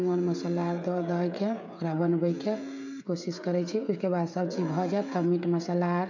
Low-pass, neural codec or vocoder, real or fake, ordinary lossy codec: 7.2 kHz; codec, 16 kHz, 16 kbps, FreqCodec, smaller model; fake; none